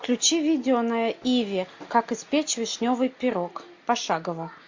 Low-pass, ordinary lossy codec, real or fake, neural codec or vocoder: 7.2 kHz; MP3, 48 kbps; real; none